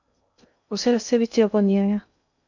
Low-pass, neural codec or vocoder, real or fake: 7.2 kHz; codec, 16 kHz in and 24 kHz out, 0.6 kbps, FocalCodec, streaming, 2048 codes; fake